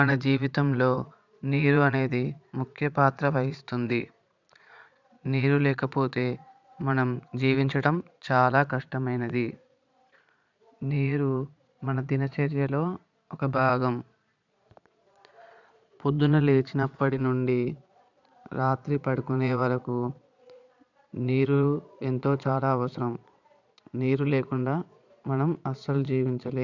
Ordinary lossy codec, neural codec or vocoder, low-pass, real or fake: none; vocoder, 22.05 kHz, 80 mel bands, Vocos; 7.2 kHz; fake